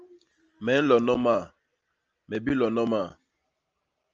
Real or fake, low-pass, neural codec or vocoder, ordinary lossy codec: real; 7.2 kHz; none; Opus, 24 kbps